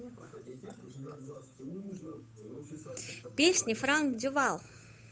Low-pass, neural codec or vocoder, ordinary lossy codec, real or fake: none; codec, 16 kHz, 8 kbps, FunCodec, trained on Chinese and English, 25 frames a second; none; fake